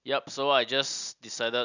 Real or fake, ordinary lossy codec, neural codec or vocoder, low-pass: real; none; none; 7.2 kHz